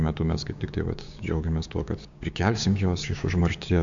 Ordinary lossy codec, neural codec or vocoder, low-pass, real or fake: MP3, 64 kbps; codec, 16 kHz, 6 kbps, DAC; 7.2 kHz; fake